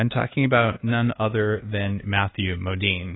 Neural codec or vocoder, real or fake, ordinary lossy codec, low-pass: codec, 24 kHz, 1.2 kbps, DualCodec; fake; AAC, 16 kbps; 7.2 kHz